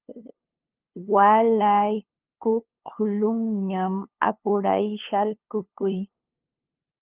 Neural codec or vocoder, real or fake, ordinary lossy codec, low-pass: codec, 16 kHz, 2 kbps, FunCodec, trained on LibriTTS, 25 frames a second; fake; Opus, 32 kbps; 3.6 kHz